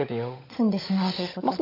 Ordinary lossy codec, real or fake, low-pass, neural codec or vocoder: AAC, 32 kbps; fake; 5.4 kHz; codec, 16 kHz, 16 kbps, FunCodec, trained on LibriTTS, 50 frames a second